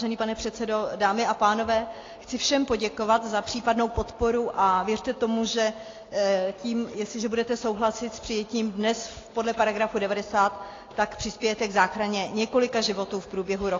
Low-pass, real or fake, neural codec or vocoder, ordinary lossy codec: 7.2 kHz; real; none; AAC, 32 kbps